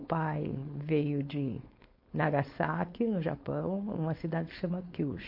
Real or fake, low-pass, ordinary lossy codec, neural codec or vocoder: fake; 5.4 kHz; MP3, 32 kbps; codec, 16 kHz, 4.8 kbps, FACodec